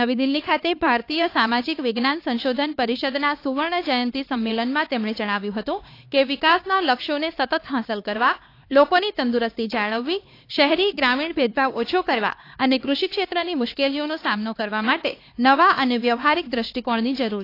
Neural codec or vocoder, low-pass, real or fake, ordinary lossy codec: codec, 16 kHz, 2 kbps, X-Codec, HuBERT features, trained on LibriSpeech; 5.4 kHz; fake; AAC, 32 kbps